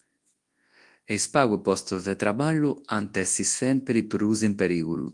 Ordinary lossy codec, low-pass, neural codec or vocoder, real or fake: Opus, 24 kbps; 10.8 kHz; codec, 24 kHz, 0.9 kbps, WavTokenizer, large speech release; fake